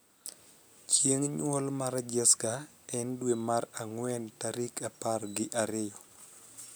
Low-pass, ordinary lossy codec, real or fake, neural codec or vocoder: none; none; real; none